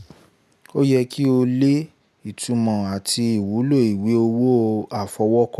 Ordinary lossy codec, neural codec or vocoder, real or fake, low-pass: none; none; real; 14.4 kHz